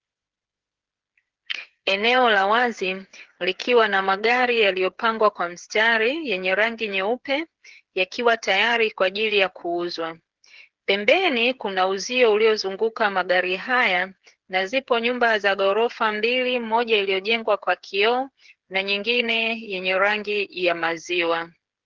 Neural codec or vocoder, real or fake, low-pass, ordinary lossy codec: codec, 16 kHz, 8 kbps, FreqCodec, smaller model; fake; 7.2 kHz; Opus, 16 kbps